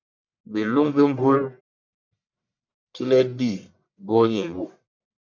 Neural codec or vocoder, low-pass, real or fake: codec, 44.1 kHz, 1.7 kbps, Pupu-Codec; 7.2 kHz; fake